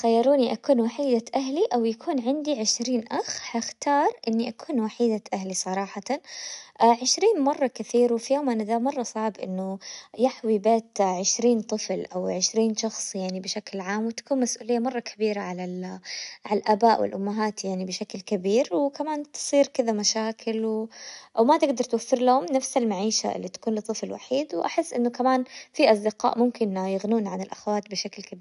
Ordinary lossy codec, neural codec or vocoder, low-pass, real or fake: none; none; 10.8 kHz; real